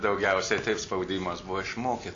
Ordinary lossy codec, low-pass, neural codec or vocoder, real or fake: AAC, 48 kbps; 7.2 kHz; none; real